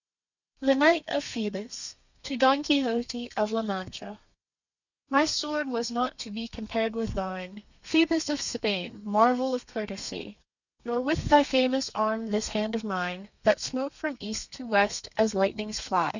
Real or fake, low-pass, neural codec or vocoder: fake; 7.2 kHz; codec, 32 kHz, 1.9 kbps, SNAC